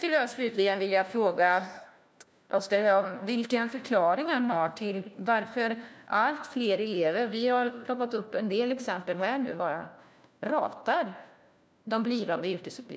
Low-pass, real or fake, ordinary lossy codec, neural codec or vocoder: none; fake; none; codec, 16 kHz, 1 kbps, FunCodec, trained on LibriTTS, 50 frames a second